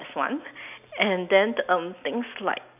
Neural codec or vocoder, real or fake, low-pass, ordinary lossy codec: none; real; 3.6 kHz; none